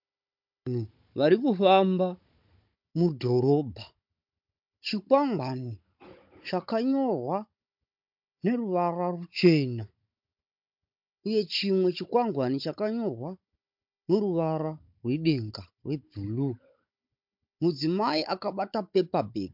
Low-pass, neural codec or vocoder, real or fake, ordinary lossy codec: 5.4 kHz; codec, 16 kHz, 16 kbps, FunCodec, trained on Chinese and English, 50 frames a second; fake; MP3, 48 kbps